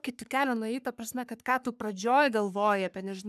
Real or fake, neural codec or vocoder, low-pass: fake; codec, 44.1 kHz, 3.4 kbps, Pupu-Codec; 14.4 kHz